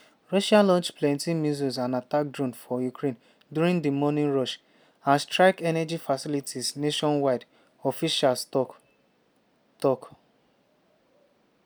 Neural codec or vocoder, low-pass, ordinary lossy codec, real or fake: none; none; none; real